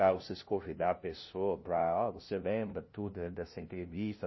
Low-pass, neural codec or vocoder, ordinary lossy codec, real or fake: 7.2 kHz; codec, 16 kHz, 0.5 kbps, FunCodec, trained on LibriTTS, 25 frames a second; MP3, 24 kbps; fake